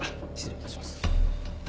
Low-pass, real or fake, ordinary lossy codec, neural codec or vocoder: none; real; none; none